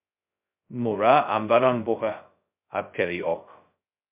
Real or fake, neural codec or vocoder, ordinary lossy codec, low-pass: fake; codec, 16 kHz, 0.2 kbps, FocalCodec; MP3, 32 kbps; 3.6 kHz